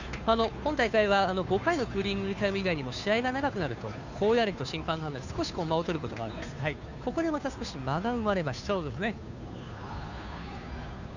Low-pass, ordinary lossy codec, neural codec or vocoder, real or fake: 7.2 kHz; none; codec, 16 kHz, 2 kbps, FunCodec, trained on Chinese and English, 25 frames a second; fake